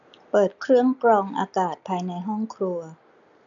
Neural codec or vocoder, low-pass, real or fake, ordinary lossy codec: none; 7.2 kHz; real; none